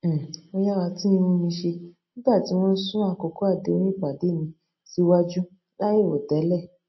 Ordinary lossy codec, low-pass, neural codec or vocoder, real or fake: MP3, 24 kbps; 7.2 kHz; none; real